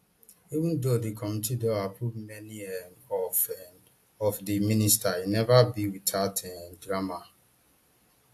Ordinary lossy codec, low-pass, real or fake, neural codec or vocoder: AAC, 64 kbps; 14.4 kHz; real; none